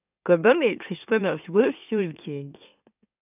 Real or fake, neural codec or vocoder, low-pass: fake; autoencoder, 44.1 kHz, a latent of 192 numbers a frame, MeloTTS; 3.6 kHz